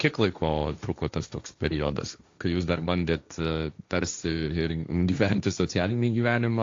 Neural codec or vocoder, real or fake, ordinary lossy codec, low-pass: codec, 16 kHz, 1.1 kbps, Voila-Tokenizer; fake; AAC, 48 kbps; 7.2 kHz